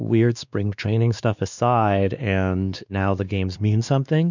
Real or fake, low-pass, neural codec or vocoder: fake; 7.2 kHz; codec, 16 kHz, 2 kbps, X-Codec, WavLM features, trained on Multilingual LibriSpeech